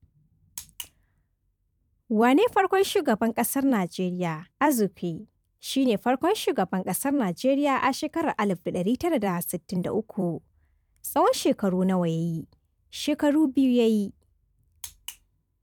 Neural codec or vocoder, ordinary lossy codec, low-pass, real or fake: vocoder, 44.1 kHz, 128 mel bands every 256 samples, BigVGAN v2; none; 19.8 kHz; fake